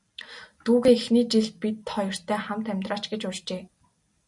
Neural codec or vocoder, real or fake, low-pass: none; real; 10.8 kHz